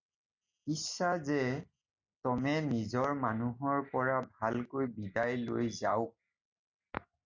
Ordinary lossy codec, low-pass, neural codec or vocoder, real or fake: MP3, 64 kbps; 7.2 kHz; none; real